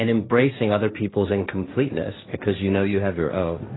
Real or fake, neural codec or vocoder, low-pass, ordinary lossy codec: fake; codec, 16 kHz, 1.1 kbps, Voila-Tokenizer; 7.2 kHz; AAC, 16 kbps